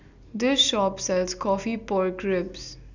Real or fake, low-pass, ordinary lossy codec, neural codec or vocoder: real; 7.2 kHz; none; none